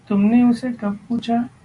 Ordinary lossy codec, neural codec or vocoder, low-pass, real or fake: Opus, 64 kbps; none; 10.8 kHz; real